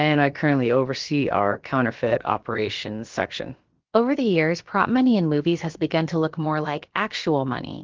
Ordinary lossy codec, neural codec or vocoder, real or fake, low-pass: Opus, 16 kbps; codec, 16 kHz, about 1 kbps, DyCAST, with the encoder's durations; fake; 7.2 kHz